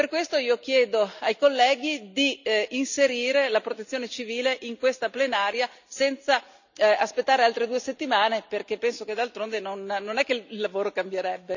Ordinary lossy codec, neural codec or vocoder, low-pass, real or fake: none; none; 7.2 kHz; real